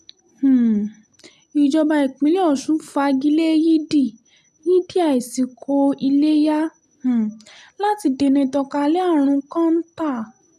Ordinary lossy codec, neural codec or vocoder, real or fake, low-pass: none; none; real; 14.4 kHz